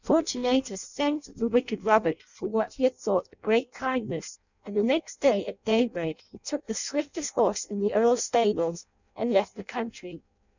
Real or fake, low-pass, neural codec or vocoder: fake; 7.2 kHz; codec, 16 kHz in and 24 kHz out, 0.6 kbps, FireRedTTS-2 codec